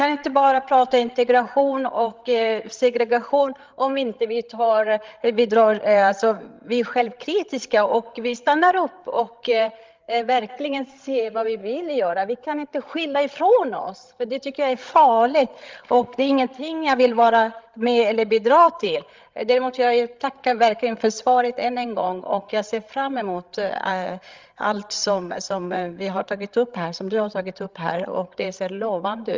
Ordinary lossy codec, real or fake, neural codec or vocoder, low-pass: Opus, 32 kbps; fake; codec, 16 kHz, 16 kbps, FreqCodec, larger model; 7.2 kHz